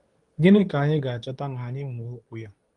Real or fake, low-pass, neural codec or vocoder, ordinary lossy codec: fake; 10.8 kHz; codec, 24 kHz, 0.9 kbps, WavTokenizer, medium speech release version 2; Opus, 32 kbps